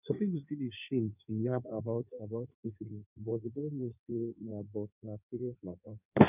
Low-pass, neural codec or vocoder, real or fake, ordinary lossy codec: 3.6 kHz; codec, 16 kHz in and 24 kHz out, 1.1 kbps, FireRedTTS-2 codec; fake; none